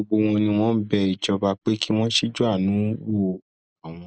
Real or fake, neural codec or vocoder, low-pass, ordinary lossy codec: real; none; none; none